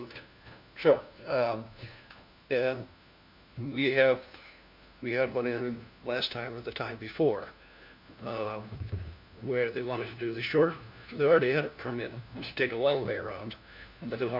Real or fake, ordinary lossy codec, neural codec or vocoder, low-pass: fake; MP3, 48 kbps; codec, 16 kHz, 1 kbps, FunCodec, trained on LibriTTS, 50 frames a second; 5.4 kHz